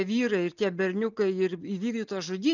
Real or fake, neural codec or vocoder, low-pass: real; none; 7.2 kHz